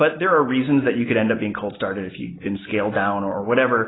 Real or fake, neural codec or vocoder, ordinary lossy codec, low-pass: real; none; AAC, 16 kbps; 7.2 kHz